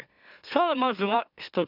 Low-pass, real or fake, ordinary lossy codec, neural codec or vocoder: 5.4 kHz; fake; none; autoencoder, 44.1 kHz, a latent of 192 numbers a frame, MeloTTS